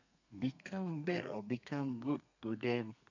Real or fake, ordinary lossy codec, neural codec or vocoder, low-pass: fake; none; codec, 32 kHz, 1.9 kbps, SNAC; 7.2 kHz